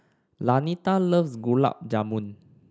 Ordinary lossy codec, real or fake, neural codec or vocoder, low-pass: none; real; none; none